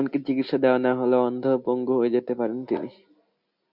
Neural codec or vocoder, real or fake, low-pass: none; real; 5.4 kHz